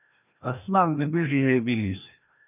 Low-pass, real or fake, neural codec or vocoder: 3.6 kHz; fake; codec, 16 kHz, 1 kbps, FreqCodec, larger model